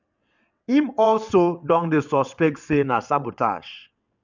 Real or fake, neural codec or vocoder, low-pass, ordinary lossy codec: fake; vocoder, 22.05 kHz, 80 mel bands, WaveNeXt; 7.2 kHz; none